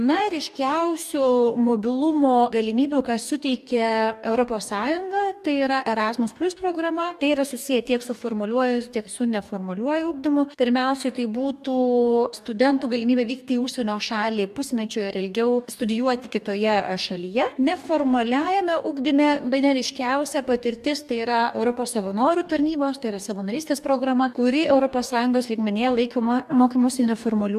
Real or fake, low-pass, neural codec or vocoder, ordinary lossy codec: fake; 14.4 kHz; codec, 44.1 kHz, 2.6 kbps, DAC; AAC, 96 kbps